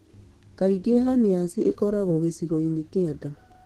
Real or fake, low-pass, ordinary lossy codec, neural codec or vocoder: fake; 14.4 kHz; Opus, 16 kbps; codec, 32 kHz, 1.9 kbps, SNAC